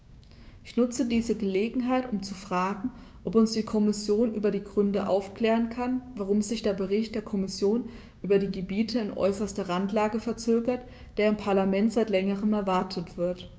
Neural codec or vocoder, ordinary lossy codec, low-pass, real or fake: codec, 16 kHz, 6 kbps, DAC; none; none; fake